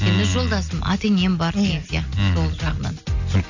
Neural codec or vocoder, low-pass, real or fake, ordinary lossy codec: none; 7.2 kHz; real; none